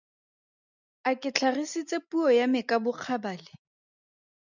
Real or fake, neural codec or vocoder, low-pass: real; none; 7.2 kHz